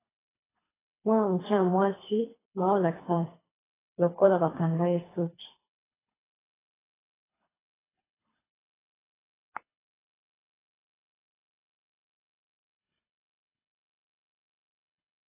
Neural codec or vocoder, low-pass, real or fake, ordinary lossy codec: codec, 24 kHz, 3 kbps, HILCodec; 3.6 kHz; fake; AAC, 16 kbps